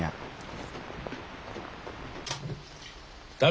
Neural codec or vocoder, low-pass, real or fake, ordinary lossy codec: none; none; real; none